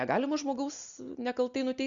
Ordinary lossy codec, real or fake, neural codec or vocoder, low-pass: Opus, 64 kbps; real; none; 7.2 kHz